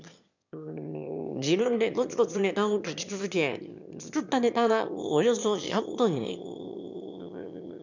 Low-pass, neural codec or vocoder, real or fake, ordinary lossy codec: 7.2 kHz; autoencoder, 22.05 kHz, a latent of 192 numbers a frame, VITS, trained on one speaker; fake; none